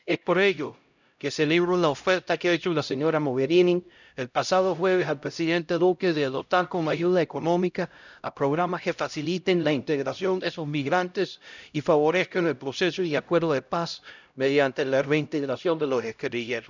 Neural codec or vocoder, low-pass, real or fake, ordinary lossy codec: codec, 16 kHz, 0.5 kbps, X-Codec, HuBERT features, trained on LibriSpeech; 7.2 kHz; fake; none